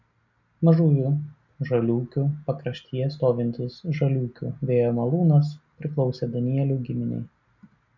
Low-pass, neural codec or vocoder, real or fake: 7.2 kHz; none; real